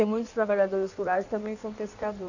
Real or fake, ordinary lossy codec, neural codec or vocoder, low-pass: fake; none; codec, 16 kHz in and 24 kHz out, 1.1 kbps, FireRedTTS-2 codec; 7.2 kHz